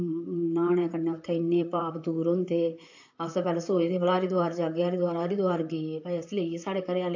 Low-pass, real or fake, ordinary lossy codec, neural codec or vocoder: 7.2 kHz; fake; AAC, 48 kbps; vocoder, 44.1 kHz, 128 mel bands, Pupu-Vocoder